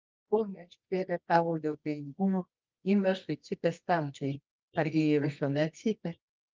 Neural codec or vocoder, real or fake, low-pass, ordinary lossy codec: codec, 24 kHz, 0.9 kbps, WavTokenizer, medium music audio release; fake; 7.2 kHz; Opus, 24 kbps